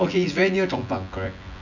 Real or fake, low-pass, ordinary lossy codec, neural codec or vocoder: fake; 7.2 kHz; none; vocoder, 24 kHz, 100 mel bands, Vocos